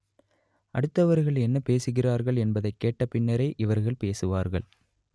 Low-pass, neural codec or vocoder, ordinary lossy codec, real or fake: none; none; none; real